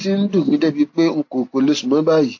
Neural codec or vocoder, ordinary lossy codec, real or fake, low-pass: none; AAC, 48 kbps; real; 7.2 kHz